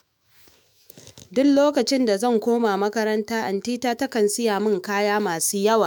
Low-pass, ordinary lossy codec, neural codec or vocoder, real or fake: none; none; autoencoder, 48 kHz, 128 numbers a frame, DAC-VAE, trained on Japanese speech; fake